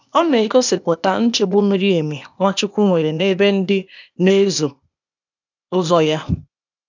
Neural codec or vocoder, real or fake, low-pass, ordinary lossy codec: codec, 16 kHz, 0.8 kbps, ZipCodec; fake; 7.2 kHz; none